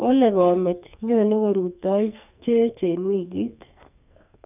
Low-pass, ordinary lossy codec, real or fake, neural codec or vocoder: 3.6 kHz; none; fake; codec, 44.1 kHz, 2.6 kbps, SNAC